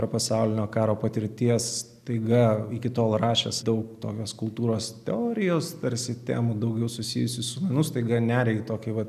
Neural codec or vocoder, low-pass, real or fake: none; 14.4 kHz; real